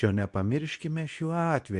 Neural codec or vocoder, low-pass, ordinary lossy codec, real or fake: codec, 24 kHz, 0.9 kbps, WavTokenizer, medium speech release version 2; 10.8 kHz; AAC, 96 kbps; fake